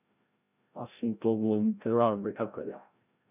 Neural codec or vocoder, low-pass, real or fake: codec, 16 kHz, 0.5 kbps, FreqCodec, larger model; 3.6 kHz; fake